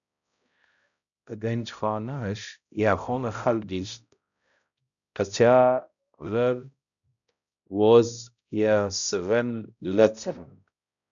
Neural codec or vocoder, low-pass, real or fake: codec, 16 kHz, 0.5 kbps, X-Codec, HuBERT features, trained on balanced general audio; 7.2 kHz; fake